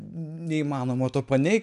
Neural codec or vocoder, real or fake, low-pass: none; real; 10.8 kHz